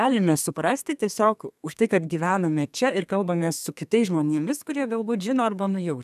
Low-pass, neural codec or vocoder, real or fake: 14.4 kHz; codec, 32 kHz, 1.9 kbps, SNAC; fake